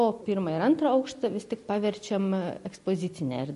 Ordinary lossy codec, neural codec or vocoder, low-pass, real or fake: MP3, 48 kbps; none; 14.4 kHz; real